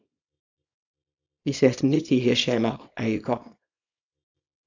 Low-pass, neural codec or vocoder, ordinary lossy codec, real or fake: 7.2 kHz; codec, 24 kHz, 0.9 kbps, WavTokenizer, small release; AAC, 48 kbps; fake